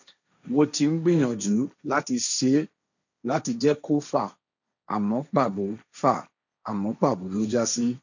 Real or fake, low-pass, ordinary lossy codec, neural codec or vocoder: fake; 7.2 kHz; none; codec, 16 kHz, 1.1 kbps, Voila-Tokenizer